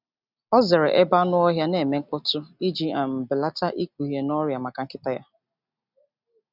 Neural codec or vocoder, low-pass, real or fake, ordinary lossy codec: none; 5.4 kHz; real; none